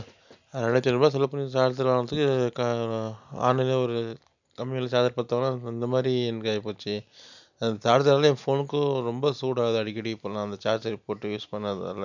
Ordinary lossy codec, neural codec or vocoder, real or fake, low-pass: none; none; real; 7.2 kHz